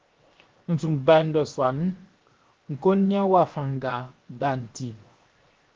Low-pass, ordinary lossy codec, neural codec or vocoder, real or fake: 7.2 kHz; Opus, 24 kbps; codec, 16 kHz, 0.7 kbps, FocalCodec; fake